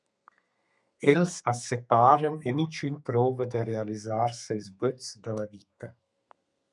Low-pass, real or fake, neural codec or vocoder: 10.8 kHz; fake; codec, 32 kHz, 1.9 kbps, SNAC